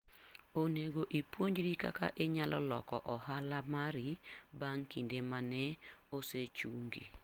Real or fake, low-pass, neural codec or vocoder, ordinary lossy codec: real; 19.8 kHz; none; Opus, 24 kbps